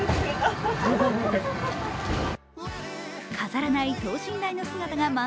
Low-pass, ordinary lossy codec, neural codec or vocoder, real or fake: none; none; none; real